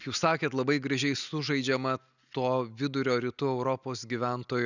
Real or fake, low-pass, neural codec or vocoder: real; 7.2 kHz; none